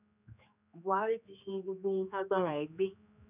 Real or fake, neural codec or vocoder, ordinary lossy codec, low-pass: fake; codec, 16 kHz, 2 kbps, X-Codec, HuBERT features, trained on general audio; none; 3.6 kHz